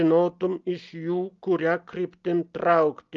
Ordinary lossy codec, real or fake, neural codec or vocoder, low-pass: Opus, 32 kbps; real; none; 7.2 kHz